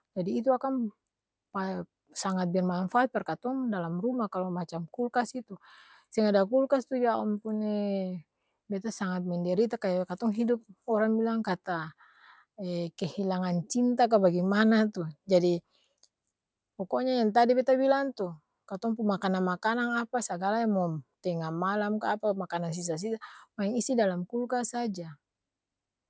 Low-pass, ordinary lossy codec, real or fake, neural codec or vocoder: none; none; real; none